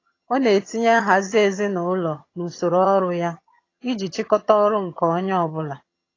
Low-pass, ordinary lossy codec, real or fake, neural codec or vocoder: 7.2 kHz; AAC, 32 kbps; fake; vocoder, 22.05 kHz, 80 mel bands, HiFi-GAN